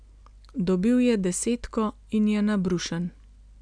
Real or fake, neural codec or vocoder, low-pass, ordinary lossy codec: real; none; 9.9 kHz; none